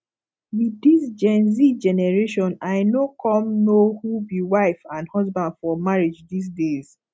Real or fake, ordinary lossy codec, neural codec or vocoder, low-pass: real; none; none; none